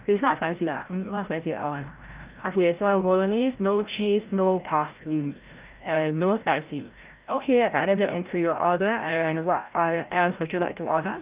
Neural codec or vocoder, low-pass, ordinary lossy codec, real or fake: codec, 16 kHz, 0.5 kbps, FreqCodec, larger model; 3.6 kHz; Opus, 24 kbps; fake